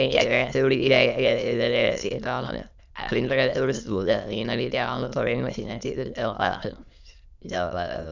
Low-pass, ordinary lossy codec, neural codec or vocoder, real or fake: 7.2 kHz; none; autoencoder, 22.05 kHz, a latent of 192 numbers a frame, VITS, trained on many speakers; fake